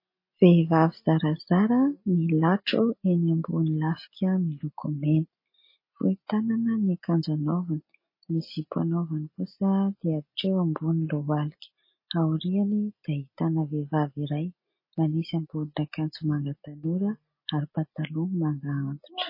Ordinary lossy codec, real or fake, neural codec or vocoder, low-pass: MP3, 24 kbps; real; none; 5.4 kHz